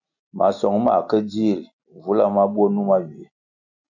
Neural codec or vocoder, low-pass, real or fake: none; 7.2 kHz; real